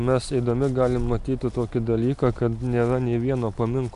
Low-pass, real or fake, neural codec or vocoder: 10.8 kHz; real; none